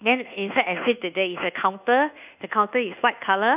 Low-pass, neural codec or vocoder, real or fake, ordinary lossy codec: 3.6 kHz; codec, 24 kHz, 1.2 kbps, DualCodec; fake; none